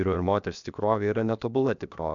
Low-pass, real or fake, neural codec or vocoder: 7.2 kHz; fake; codec, 16 kHz, 0.7 kbps, FocalCodec